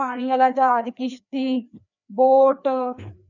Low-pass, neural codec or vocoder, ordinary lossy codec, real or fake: 7.2 kHz; codec, 16 kHz, 2 kbps, FreqCodec, larger model; none; fake